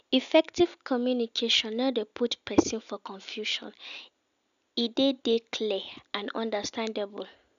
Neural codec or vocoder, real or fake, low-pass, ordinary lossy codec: none; real; 7.2 kHz; none